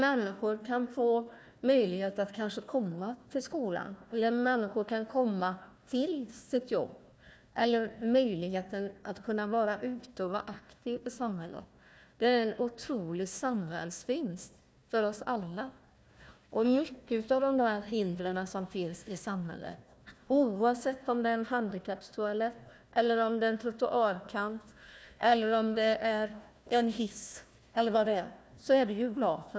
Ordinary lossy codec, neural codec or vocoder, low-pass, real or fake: none; codec, 16 kHz, 1 kbps, FunCodec, trained on Chinese and English, 50 frames a second; none; fake